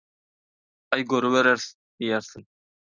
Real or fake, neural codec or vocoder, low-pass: real; none; 7.2 kHz